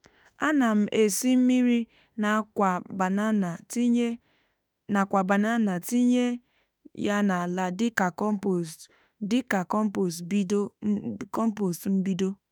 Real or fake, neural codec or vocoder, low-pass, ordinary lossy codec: fake; autoencoder, 48 kHz, 32 numbers a frame, DAC-VAE, trained on Japanese speech; none; none